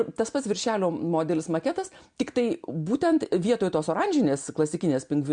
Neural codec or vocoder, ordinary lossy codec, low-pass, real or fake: none; MP3, 64 kbps; 9.9 kHz; real